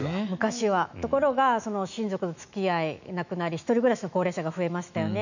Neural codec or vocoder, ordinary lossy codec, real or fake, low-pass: autoencoder, 48 kHz, 128 numbers a frame, DAC-VAE, trained on Japanese speech; none; fake; 7.2 kHz